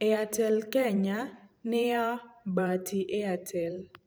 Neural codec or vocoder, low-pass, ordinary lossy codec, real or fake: vocoder, 44.1 kHz, 128 mel bands every 512 samples, BigVGAN v2; none; none; fake